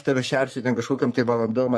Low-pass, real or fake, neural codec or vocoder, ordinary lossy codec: 14.4 kHz; fake; codec, 44.1 kHz, 3.4 kbps, Pupu-Codec; MP3, 64 kbps